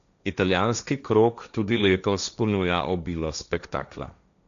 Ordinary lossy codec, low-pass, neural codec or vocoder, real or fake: MP3, 96 kbps; 7.2 kHz; codec, 16 kHz, 1.1 kbps, Voila-Tokenizer; fake